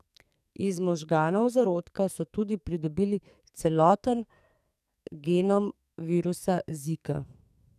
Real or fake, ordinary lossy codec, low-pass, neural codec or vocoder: fake; none; 14.4 kHz; codec, 44.1 kHz, 2.6 kbps, SNAC